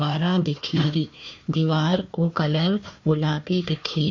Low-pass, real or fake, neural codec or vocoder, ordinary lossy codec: 7.2 kHz; fake; codec, 16 kHz, 1 kbps, FunCodec, trained on Chinese and English, 50 frames a second; MP3, 48 kbps